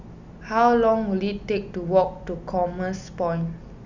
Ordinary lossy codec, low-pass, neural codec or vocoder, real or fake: Opus, 64 kbps; 7.2 kHz; none; real